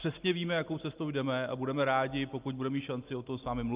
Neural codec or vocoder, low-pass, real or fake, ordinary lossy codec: none; 3.6 kHz; real; Opus, 32 kbps